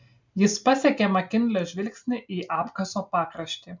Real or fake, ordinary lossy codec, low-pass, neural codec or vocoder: real; MP3, 64 kbps; 7.2 kHz; none